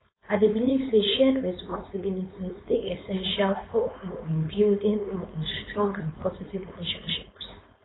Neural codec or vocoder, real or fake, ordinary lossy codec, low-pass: codec, 16 kHz, 4.8 kbps, FACodec; fake; AAC, 16 kbps; 7.2 kHz